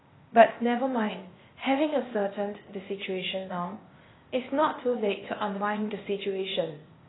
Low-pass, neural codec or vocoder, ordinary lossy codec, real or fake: 7.2 kHz; codec, 16 kHz, 0.8 kbps, ZipCodec; AAC, 16 kbps; fake